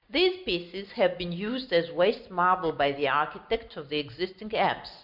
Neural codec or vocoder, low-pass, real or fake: none; 5.4 kHz; real